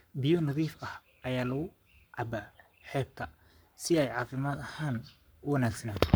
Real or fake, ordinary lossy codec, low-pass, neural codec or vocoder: fake; none; none; codec, 44.1 kHz, 7.8 kbps, Pupu-Codec